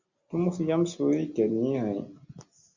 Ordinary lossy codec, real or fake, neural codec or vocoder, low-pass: Opus, 64 kbps; real; none; 7.2 kHz